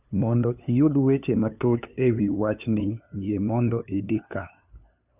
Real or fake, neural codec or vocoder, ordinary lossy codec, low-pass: fake; codec, 16 kHz, 2 kbps, FunCodec, trained on LibriTTS, 25 frames a second; none; 3.6 kHz